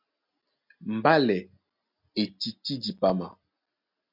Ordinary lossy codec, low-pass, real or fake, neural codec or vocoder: MP3, 48 kbps; 5.4 kHz; real; none